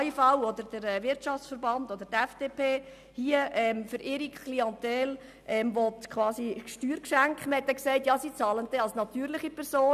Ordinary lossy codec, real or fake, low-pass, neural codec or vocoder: none; real; 14.4 kHz; none